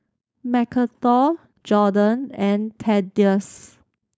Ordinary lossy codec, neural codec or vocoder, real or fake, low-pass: none; codec, 16 kHz, 4.8 kbps, FACodec; fake; none